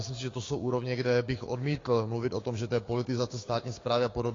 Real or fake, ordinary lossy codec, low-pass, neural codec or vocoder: fake; AAC, 32 kbps; 7.2 kHz; codec, 16 kHz, 6 kbps, DAC